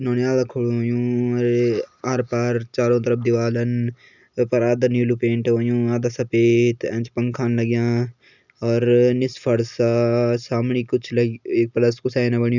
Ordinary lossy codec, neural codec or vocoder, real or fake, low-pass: none; none; real; 7.2 kHz